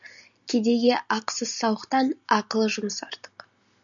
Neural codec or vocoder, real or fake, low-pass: none; real; 7.2 kHz